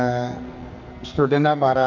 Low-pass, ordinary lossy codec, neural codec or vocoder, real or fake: 7.2 kHz; none; codec, 44.1 kHz, 2.6 kbps, SNAC; fake